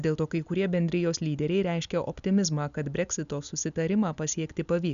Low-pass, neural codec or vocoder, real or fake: 7.2 kHz; none; real